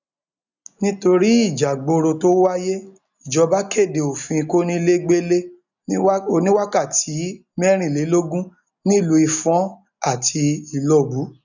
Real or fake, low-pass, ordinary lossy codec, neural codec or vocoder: real; 7.2 kHz; none; none